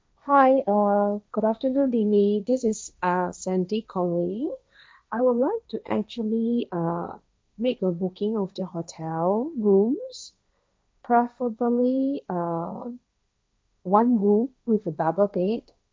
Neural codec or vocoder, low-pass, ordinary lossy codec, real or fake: codec, 16 kHz, 1.1 kbps, Voila-Tokenizer; none; none; fake